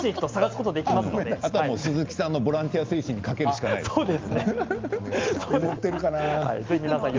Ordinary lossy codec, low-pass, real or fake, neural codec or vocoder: Opus, 32 kbps; 7.2 kHz; real; none